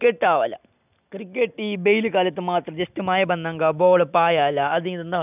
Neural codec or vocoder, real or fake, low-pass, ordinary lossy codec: none; real; 3.6 kHz; none